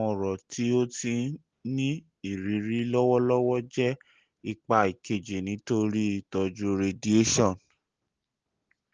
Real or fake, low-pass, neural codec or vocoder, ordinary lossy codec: real; 7.2 kHz; none; Opus, 16 kbps